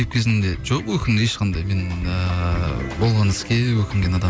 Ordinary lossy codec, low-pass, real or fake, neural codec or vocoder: none; none; real; none